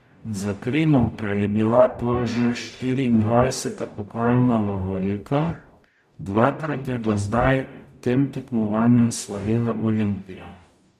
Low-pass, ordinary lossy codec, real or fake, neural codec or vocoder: 14.4 kHz; none; fake; codec, 44.1 kHz, 0.9 kbps, DAC